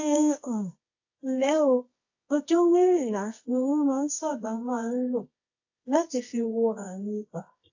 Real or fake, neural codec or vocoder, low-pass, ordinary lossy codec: fake; codec, 24 kHz, 0.9 kbps, WavTokenizer, medium music audio release; 7.2 kHz; AAC, 48 kbps